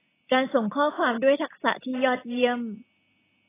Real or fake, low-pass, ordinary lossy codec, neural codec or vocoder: real; 3.6 kHz; AAC, 16 kbps; none